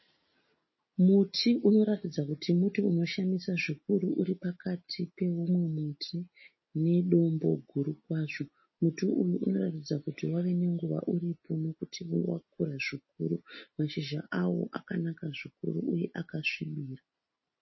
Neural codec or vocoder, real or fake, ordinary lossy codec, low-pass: none; real; MP3, 24 kbps; 7.2 kHz